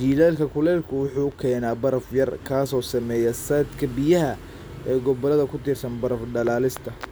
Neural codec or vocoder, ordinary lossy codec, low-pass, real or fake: none; none; none; real